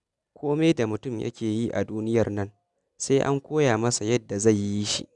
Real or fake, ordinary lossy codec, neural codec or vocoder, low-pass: real; none; none; 10.8 kHz